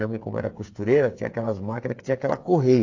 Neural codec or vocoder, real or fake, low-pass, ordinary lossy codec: codec, 16 kHz, 8 kbps, FreqCodec, smaller model; fake; 7.2 kHz; AAC, 48 kbps